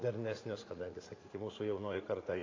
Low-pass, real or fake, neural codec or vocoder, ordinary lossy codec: 7.2 kHz; fake; vocoder, 44.1 kHz, 80 mel bands, Vocos; AAC, 32 kbps